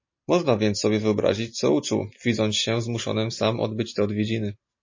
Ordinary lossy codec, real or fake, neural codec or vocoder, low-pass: MP3, 32 kbps; real; none; 7.2 kHz